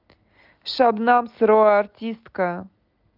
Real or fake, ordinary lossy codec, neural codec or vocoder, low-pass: real; Opus, 32 kbps; none; 5.4 kHz